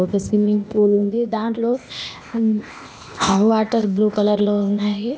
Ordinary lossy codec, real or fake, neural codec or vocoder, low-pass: none; fake; codec, 16 kHz, 0.8 kbps, ZipCodec; none